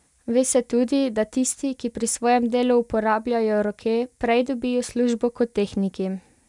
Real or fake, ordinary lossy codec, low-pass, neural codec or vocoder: real; none; 10.8 kHz; none